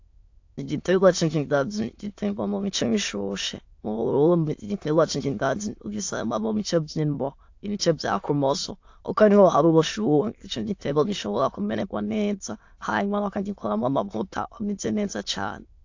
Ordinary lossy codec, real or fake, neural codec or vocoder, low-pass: AAC, 48 kbps; fake; autoencoder, 22.05 kHz, a latent of 192 numbers a frame, VITS, trained on many speakers; 7.2 kHz